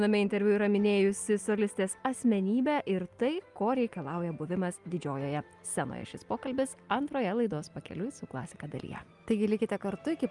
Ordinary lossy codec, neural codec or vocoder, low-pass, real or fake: Opus, 32 kbps; none; 10.8 kHz; real